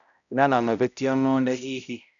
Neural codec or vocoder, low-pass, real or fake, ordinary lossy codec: codec, 16 kHz, 0.5 kbps, X-Codec, HuBERT features, trained on balanced general audio; 7.2 kHz; fake; none